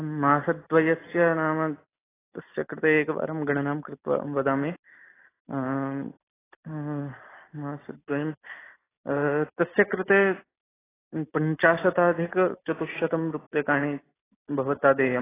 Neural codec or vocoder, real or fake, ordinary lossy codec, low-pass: none; real; AAC, 16 kbps; 3.6 kHz